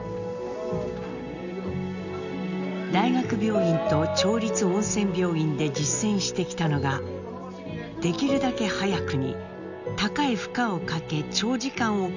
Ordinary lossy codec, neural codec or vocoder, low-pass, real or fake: none; none; 7.2 kHz; real